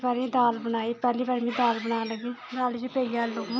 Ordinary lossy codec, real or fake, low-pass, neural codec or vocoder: none; real; none; none